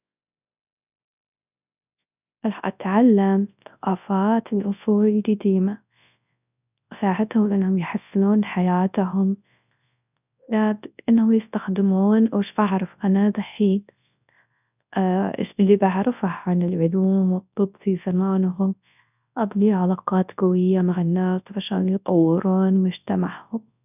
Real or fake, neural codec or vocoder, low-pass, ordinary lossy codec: fake; codec, 24 kHz, 0.9 kbps, WavTokenizer, large speech release; 3.6 kHz; none